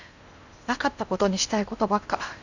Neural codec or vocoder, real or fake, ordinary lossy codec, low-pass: codec, 16 kHz in and 24 kHz out, 0.8 kbps, FocalCodec, streaming, 65536 codes; fake; Opus, 64 kbps; 7.2 kHz